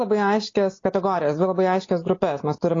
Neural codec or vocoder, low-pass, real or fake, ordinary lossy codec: none; 7.2 kHz; real; AAC, 32 kbps